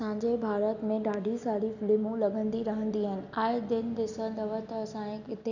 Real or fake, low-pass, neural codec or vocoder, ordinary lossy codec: real; 7.2 kHz; none; none